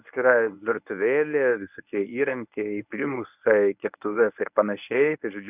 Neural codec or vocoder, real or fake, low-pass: codec, 24 kHz, 0.9 kbps, WavTokenizer, medium speech release version 1; fake; 3.6 kHz